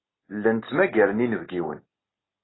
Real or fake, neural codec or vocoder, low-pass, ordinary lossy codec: real; none; 7.2 kHz; AAC, 16 kbps